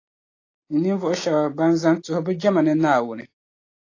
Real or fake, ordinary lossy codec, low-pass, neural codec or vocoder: real; AAC, 32 kbps; 7.2 kHz; none